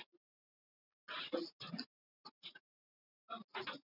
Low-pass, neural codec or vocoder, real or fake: 5.4 kHz; none; real